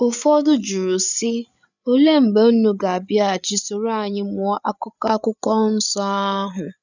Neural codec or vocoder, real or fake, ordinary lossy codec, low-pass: codec, 16 kHz, 8 kbps, FreqCodec, larger model; fake; none; 7.2 kHz